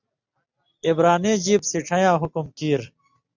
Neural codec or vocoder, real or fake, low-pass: none; real; 7.2 kHz